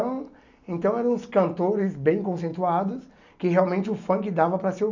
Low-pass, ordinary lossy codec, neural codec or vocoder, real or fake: 7.2 kHz; none; none; real